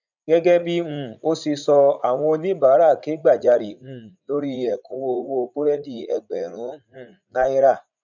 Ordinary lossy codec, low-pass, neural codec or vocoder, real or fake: none; 7.2 kHz; vocoder, 44.1 kHz, 80 mel bands, Vocos; fake